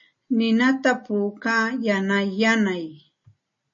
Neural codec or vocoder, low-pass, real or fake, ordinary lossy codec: none; 7.2 kHz; real; MP3, 32 kbps